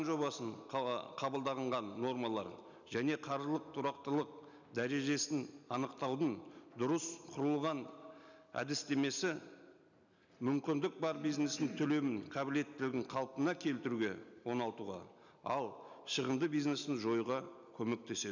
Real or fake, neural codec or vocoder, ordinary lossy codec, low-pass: real; none; none; 7.2 kHz